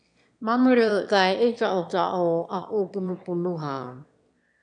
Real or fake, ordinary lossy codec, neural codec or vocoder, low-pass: fake; MP3, 96 kbps; autoencoder, 22.05 kHz, a latent of 192 numbers a frame, VITS, trained on one speaker; 9.9 kHz